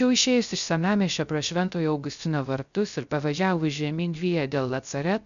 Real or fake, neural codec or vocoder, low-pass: fake; codec, 16 kHz, 0.3 kbps, FocalCodec; 7.2 kHz